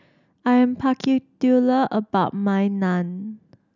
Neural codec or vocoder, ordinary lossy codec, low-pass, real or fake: none; none; 7.2 kHz; real